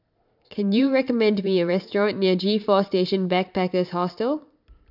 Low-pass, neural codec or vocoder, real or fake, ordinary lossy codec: 5.4 kHz; vocoder, 44.1 kHz, 128 mel bands every 512 samples, BigVGAN v2; fake; none